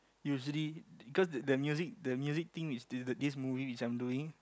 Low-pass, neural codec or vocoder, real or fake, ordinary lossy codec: none; none; real; none